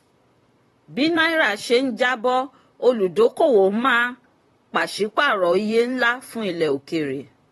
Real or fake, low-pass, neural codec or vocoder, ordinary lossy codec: fake; 19.8 kHz; vocoder, 44.1 kHz, 128 mel bands, Pupu-Vocoder; AAC, 32 kbps